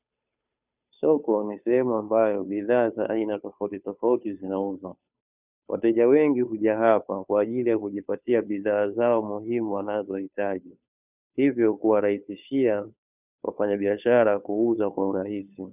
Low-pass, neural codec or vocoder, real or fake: 3.6 kHz; codec, 16 kHz, 2 kbps, FunCodec, trained on Chinese and English, 25 frames a second; fake